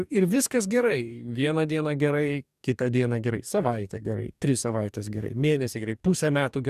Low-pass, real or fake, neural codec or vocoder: 14.4 kHz; fake; codec, 44.1 kHz, 2.6 kbps, DAC